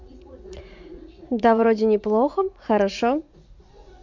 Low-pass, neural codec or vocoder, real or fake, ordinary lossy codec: 7.2 kHz; vocoder, 44.1 kHz, 80 mel bands, Vocos; fake; MP3, 48 kbps